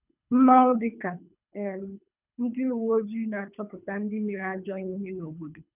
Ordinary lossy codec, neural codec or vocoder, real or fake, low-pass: none; codec, 24 kHz, 3 kbps, HILCodec; fake; 3.6 kHz